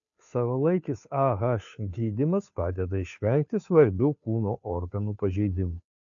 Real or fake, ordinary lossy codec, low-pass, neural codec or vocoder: fake; AAC, 64 kbps; 7.2 kHz; codec, 16 kHz, 2 kbps, FunCodec, trained on Chinese and English, 25 frames a second